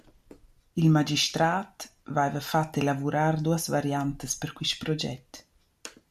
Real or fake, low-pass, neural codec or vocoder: real; 14.4 kHz; none